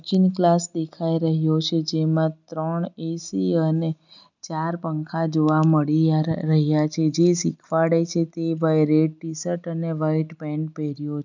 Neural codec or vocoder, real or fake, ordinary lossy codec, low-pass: none; real; none; 7.2 kHz